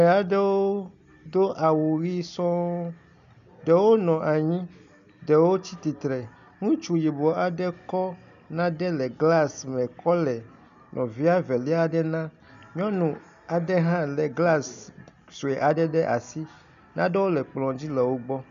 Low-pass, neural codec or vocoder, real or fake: 7.2 kHz; none; real